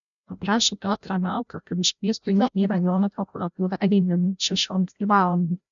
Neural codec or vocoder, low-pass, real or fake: codec, 16 kHz, 0.5 kbps, FreqCodec, larger model; 7.2 kHz; fake